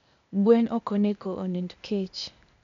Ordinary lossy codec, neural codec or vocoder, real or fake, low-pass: MP3, 64 kbps; codec, 16 kHz, 0.8 kbps, ZipCodec; fake; 7.2 kHz